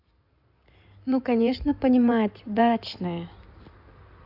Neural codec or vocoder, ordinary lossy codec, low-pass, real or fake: codec, 16 kHz in and 24 kHz out, 2.2 kbps, FireRedTTS-2 codec; AAC, 48 kbps; 5.4 kHz; fake